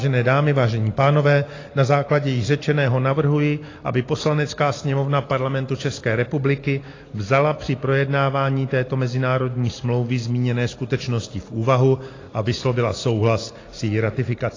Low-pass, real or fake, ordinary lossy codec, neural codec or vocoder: 7.2 kHz; real; AAC, 32 kbps; none